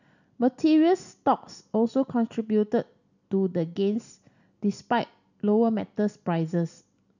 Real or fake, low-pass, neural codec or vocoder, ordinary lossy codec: real; 7.2 kHz; none; none